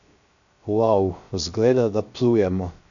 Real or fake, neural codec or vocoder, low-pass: fake; codec, 16 kHz, 0.3 kbps, FocalCodec; 7.2 kHz